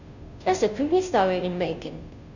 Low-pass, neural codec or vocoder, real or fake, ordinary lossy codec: 7.2 kHz; codec, 16 kHz, 0.5 kbps, FunCodec, trained on Chinese and English, 25 frames a second; fake; none